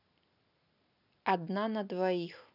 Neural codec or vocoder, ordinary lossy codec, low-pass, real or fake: none; none; 5.4 kHz; real